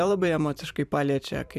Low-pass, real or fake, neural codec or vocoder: 14.4 kHz; fake; vocoder, 44.1 kHz, 128 mel bands every 256 samples, BigVGAN v2